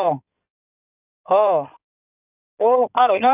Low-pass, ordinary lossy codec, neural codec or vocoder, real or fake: 3.6 kHz; none; codec, 16 kHz in and 24 kHz out, 2.2 kbps, FireRedTTS-2 codec; fake